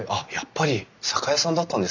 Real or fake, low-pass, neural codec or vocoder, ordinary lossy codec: real; 7.2 kHz; none; none